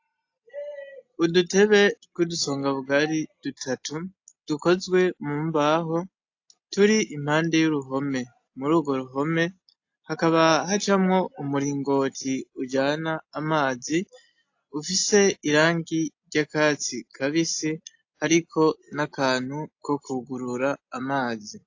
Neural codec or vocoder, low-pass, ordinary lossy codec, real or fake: none; 7.2 kHz; AAC, 48 kbps; real